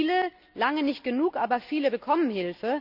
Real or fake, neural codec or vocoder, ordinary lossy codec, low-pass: real; none; none; 5.4 kHz